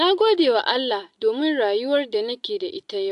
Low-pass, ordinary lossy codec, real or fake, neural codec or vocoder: 10.8 kHz; AAC, 64 kbps; real; none